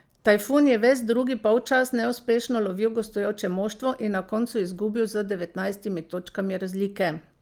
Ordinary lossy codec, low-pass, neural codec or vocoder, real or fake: Opus, 32 kbps; 19.8 kHz; none; real